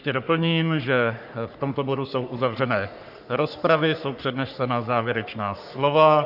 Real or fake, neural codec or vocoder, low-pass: fake; codec, 44.1 kHz, 3.4 kbps, Pupu-Codec; 5.4 kHz